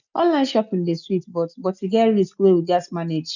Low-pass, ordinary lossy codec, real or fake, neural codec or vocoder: 7.2 kHz; none; real; none